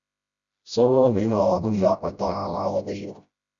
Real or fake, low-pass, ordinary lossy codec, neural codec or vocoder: fake; 7.2 kHz; Opus, 64 kbps; codec, 16 kHz, 0.5 kbps, FreqCodec, smaller model